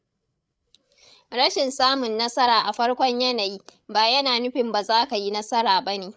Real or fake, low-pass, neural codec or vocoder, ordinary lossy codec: fake; none; codec, 16 kHz, 8 kbps, FreqCodec, larger model; none